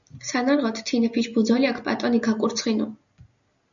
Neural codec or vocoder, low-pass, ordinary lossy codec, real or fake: none; 7.2 kHz; MP3, 96 kbps; real